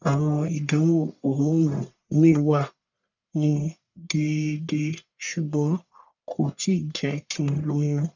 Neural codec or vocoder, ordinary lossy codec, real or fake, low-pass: codec, 44.1 kHz, 1.7 kbps, Pupu-Codec; none; fake; 7.2 kHz